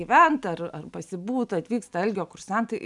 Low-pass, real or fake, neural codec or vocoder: 10.8 kHz; real; none